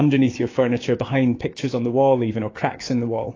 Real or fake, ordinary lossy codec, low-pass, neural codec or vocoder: fake; AAC, 32 kbps; 7.2 kHz; codec, 16 kHz, 6 kbps, DAC